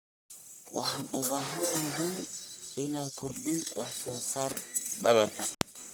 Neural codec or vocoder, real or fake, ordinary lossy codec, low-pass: codec, 44.1 kHz, 1.7 kbps, Pupu-Codec; fake; none; none